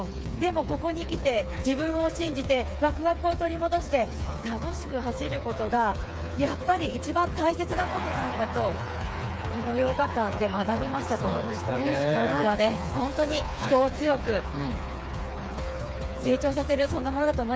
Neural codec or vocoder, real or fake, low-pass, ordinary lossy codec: codec, 16 kHz, 4 kbps, FreqCodec, smaller model; fake; none; none